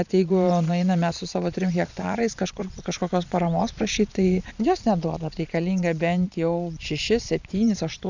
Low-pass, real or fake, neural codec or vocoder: 7.2 kHz; fake; vocoder, 22.05 kHz, 80 mel bands, Vocos